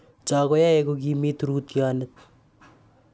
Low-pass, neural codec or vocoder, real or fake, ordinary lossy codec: none; none; real; none